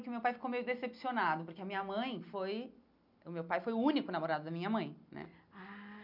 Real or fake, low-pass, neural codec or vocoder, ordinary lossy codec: real; 5.4 kHz; none; none